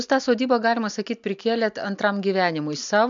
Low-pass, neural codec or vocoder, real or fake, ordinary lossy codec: 7.2 kHz; none; real; MP3, 64 kbps